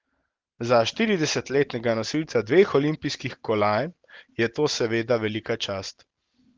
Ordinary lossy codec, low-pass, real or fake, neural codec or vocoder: Opus, 16 kbps; 7.2 kHz; real; none